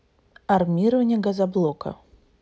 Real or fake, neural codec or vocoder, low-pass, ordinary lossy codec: real; none; none; none